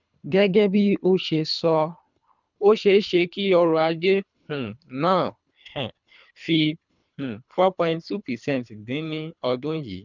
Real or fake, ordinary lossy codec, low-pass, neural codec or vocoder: fake; none; 7.2 kHz; codec, 24 kHz, 3 kbps, HILCodec